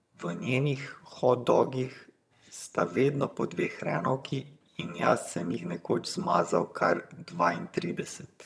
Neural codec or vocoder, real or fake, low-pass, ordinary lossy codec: vocoder, 22.05 kHz, 80 mel bands, HiFi-GAN; fake; none; none